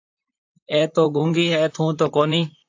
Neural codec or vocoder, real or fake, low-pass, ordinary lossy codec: vocoder, 44.1 kHz, 128 mel bands, Pupu-Vocoder; fake; 7.2 kHz; AAC, 48 kbps